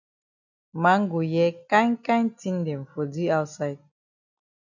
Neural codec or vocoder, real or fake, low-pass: none; real; 7.2 kHz